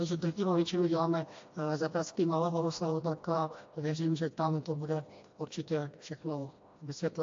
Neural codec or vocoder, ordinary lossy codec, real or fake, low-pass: codec, 16 kHz, 1 kbps, FreqCodec, smaller model; AAC, 48 kbps; fake; 7.2 kHz